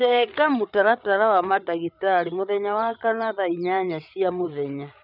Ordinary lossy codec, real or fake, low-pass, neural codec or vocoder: none; fake; 5.4 kHz; codec, 16 kHz, 8 kbps, FreqCodec, larger model